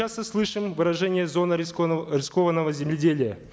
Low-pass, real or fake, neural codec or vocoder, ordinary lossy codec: none; real; none; none